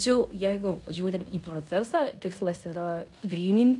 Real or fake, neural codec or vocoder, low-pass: fake; codec, 16 kHz in and 24 kHz out, 0.9 kbps, LongCat-Audio-Codec, fine tuned four codebook decoder; 10.8 kHz